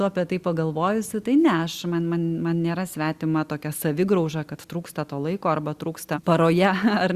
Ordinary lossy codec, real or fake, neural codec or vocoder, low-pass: Opus, 64 kbps; real; none; 14.4 kHz